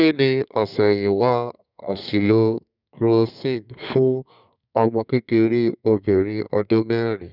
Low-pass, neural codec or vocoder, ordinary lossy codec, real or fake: 5.4 kHz; codec, 32 kHz, 1.9 kbps, SNAC; none; fake